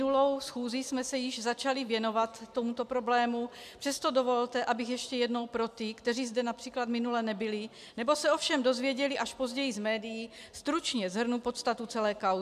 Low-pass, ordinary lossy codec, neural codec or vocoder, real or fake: 14.4 kHz; MP3, 96 kbps; none; real